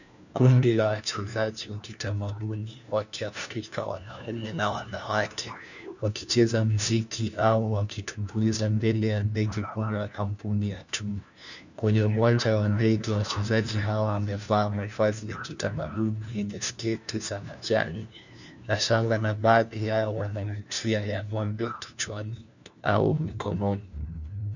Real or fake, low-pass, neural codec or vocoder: fake; 7.2 kHz; codec, 16 kHz, 1 kbps, FunCodec, trained on LibriTTS, 50 frames a second